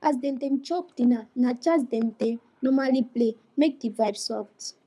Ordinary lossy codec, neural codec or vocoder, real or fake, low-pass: none; codec, 24 kHz, 6 kbps, HILCodec; fake; none